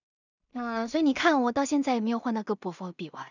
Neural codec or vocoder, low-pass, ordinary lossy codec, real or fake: codec, 16 kHz in and 24 kHz out, 0.4 kbps, LongCat-Audio-Codec, two codebook decoder; 7.2 kHz; none; fake